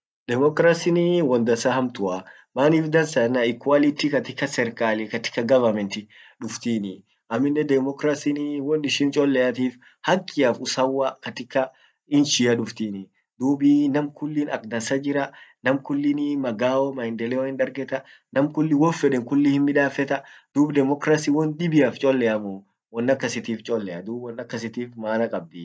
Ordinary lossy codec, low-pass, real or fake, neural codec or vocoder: none; none; real; none